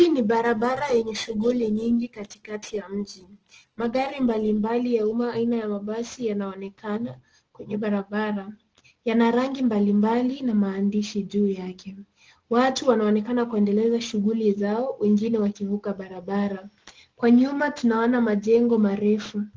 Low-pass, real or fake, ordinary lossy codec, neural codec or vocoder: 7.2 kHz; real; Opus, 16 kbps; none